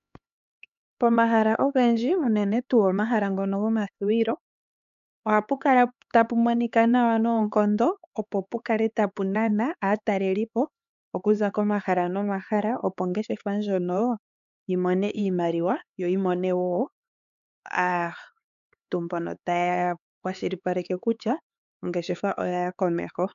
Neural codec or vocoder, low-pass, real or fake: codec, 16 kHz, 4 kbps, X-Codec, HuBERT features, trained on LibriSpeech; 7.2 kHz; fake